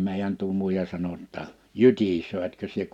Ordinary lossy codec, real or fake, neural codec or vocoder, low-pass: none; real; none; 19.8 kHz